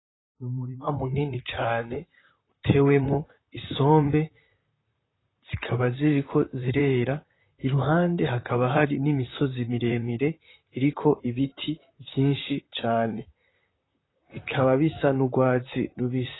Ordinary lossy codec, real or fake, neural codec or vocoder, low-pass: AAC, 16 kbps; fake; vocoder, 44.1 kHz, 128 mel bands, Pupu-Vocoder; 7.2 kHz